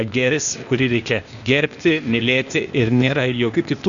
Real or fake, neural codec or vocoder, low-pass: fake; codec, 16 kHz, 0.8 kbps, ZipCodec; 7.2 kHz